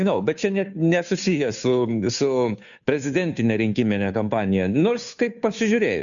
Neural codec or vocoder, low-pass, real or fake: codec, 16 kHz, 2 kbps, FunCodec, trained on Chinese and English, 25 frames a second; 7.2 kHz; fake